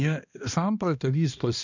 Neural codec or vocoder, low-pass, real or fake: codec, 16 kHz, 1 kbps, X-Codec, HuBERT features, trained on balanced general audio; 7.2 kHz; fake